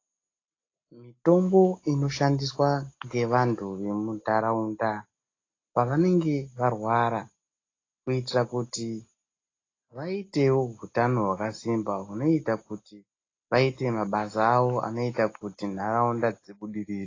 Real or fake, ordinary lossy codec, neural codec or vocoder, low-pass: real; AAC, 32 kbps; none; 7.2 kHz